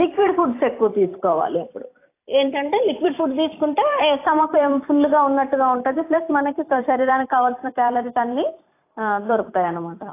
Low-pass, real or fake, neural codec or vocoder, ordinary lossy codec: 3.6 kHz; fake; vocoder, 44.1 kHz, 128 mel bands every 256 samples, BigVGAN v2; AAC, 24 kbps